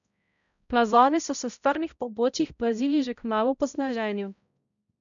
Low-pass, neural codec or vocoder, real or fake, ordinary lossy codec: 7.2 kHz; codec, 16 kHz, 0.5 kbps, X-Codec, HuBERT features, trained on balanced general audio; fake; none